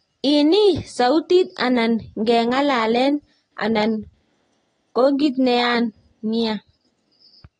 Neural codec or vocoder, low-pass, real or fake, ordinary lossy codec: none; 9.9 kHz; real; AAC, 32 kbps